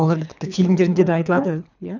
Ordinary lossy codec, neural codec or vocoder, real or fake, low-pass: none; codec, 24 kHz, 3 kbps, HILCodec; fake; 7.2 kHz